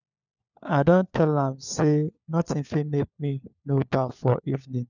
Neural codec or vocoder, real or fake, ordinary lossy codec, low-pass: codec, 16 kHz, 4 kbps, FunCodec, trained on LibriTTS, 50 frames a second; fake; none; 7.2 kHz